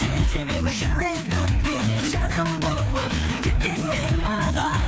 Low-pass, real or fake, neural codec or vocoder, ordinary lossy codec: none; fake; codec, 16 kHz, 2 kbps, FreqCodec, larger model; none